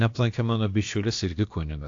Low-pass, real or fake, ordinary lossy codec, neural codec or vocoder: 7.2 kHz; fake; AAC, 48 kbps; codec, 16 kHz, about 1 kbps, DyCAST, with the encoder's durations